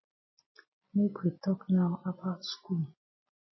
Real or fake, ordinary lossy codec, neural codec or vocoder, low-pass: real; MP3, 24 kbps; none; 7.2 kHz